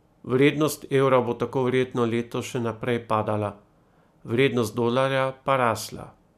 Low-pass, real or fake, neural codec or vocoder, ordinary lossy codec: 14.4 kHz; real; none; none